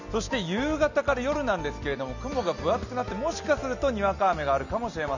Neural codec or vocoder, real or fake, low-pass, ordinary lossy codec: none; real; 7.2 kHz; none